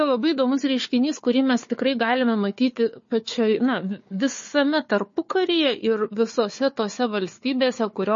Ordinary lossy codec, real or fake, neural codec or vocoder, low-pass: MP3, 32 kbps; fake; codec, 16 kHz, 4 kbps, FunCodec, trained on Chinese and English, 50 frames a second; 7.2 kHz